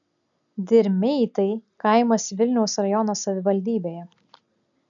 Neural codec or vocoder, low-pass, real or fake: none; 7.2 kHz; real